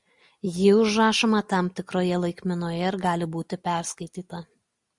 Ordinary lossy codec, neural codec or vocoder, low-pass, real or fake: MP3, 96 kbps; none; 10.8 kHz; real